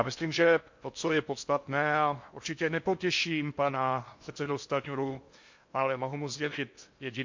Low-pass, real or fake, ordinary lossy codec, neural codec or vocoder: 7.2 kHz; fake; MP3, 48 kbps; codec, 16 kHz in and 24 kHz out, 0.6 kbps, FocalCodec, streaming, 4096 codes